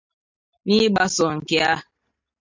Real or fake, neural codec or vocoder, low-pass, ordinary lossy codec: real; none; 7.2 kHz; MP3, 64 kbps